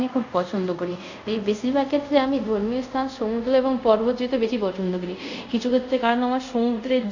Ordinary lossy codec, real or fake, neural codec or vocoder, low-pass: none; fake; codec, 24 kHz, 0.5 kbps, DualCodec; 7.2 kHz